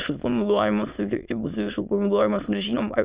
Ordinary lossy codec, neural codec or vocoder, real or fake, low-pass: Opus, 64 kbps; autoencoder, 22.05 kHz, a latent of 192 numbers a frame, VITS, trained on many speakers; fake; 3.6 kHz